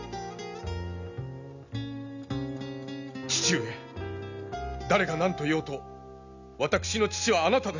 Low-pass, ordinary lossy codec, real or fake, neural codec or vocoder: 7.2 kHz; none; real; none